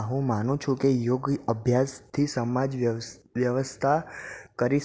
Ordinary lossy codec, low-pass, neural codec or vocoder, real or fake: none; none; none; real